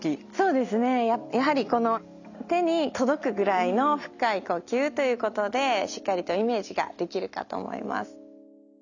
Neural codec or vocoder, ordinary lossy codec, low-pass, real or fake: none; none; 7.2 kHz; real